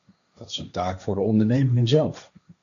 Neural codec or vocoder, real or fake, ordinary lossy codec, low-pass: codec, 16 kHz, 1.1 kbps, Voila-Tokenizer; fake; MP3, 96 kbps; 7.2 kHz